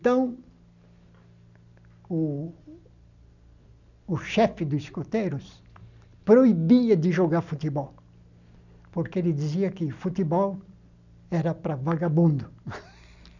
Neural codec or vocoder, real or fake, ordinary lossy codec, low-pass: none; real; none; 7.2 kHz